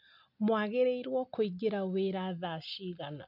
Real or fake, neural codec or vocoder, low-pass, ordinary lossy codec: real; none; 5.4 kHz; none